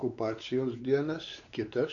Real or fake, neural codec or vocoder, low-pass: fake; codec, 16 kHz, 4 kbps, X-Codec, WavLM features, trained on Multilingual LibriSpeech; 7.2 kHz